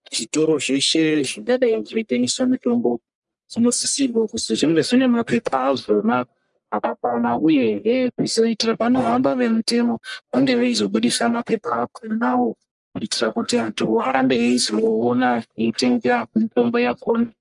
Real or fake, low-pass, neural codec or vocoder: fake; 10.8 kHz; codec, 44.1 kHz, 1.7 kbps, Pupu-Codec